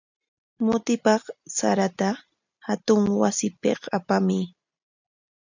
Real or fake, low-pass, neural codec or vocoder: real; 7.2 kHz; none